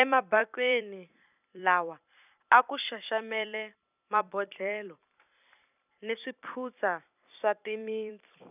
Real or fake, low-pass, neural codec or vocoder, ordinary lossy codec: fake; 3.6 kHz; vocoder, 44.1 kHz, 128 mel bands every 256 samples, BigVGAN v2; none